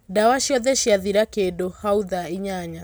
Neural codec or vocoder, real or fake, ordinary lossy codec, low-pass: none; real; none; none